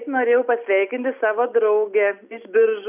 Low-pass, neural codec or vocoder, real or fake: 3.6 kHz; none; real